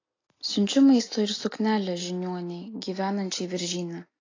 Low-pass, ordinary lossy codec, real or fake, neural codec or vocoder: 7.2 kHz; AAC, 32 kbps; real; none